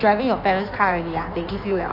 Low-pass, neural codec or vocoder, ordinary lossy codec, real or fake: 5.4 kHz; codec, 16 kHz in and 24 kHz out, 1.1 kbps, FireRedTTS-2 codec; none; fake